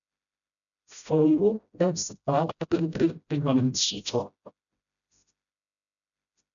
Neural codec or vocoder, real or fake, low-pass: codec, 16 kHz, 0.5 kbps, FreqCodec, smaller model; fake; 7.2 kHz